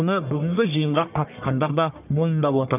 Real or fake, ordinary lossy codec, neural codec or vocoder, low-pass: fake; none; codec, 44.1 kHz, 1.7 kbps, Pupu-Codec; 3.6 kHz